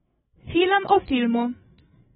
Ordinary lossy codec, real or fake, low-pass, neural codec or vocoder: AAC, 16 kbps; fake; 7.2 kHz; codec, 16 kHz, 16 kbps, FreqCodec, larger model